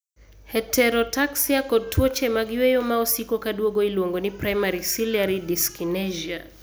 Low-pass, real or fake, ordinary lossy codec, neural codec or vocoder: none; real; none; none